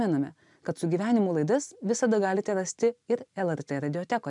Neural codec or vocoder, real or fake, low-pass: none; real; 10.8 kHz